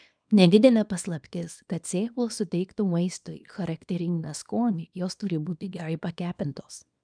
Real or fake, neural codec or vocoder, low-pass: fake; codec, 24 kHz, 0.9 kbps, WavTokenizer, small release; 9.9 kHz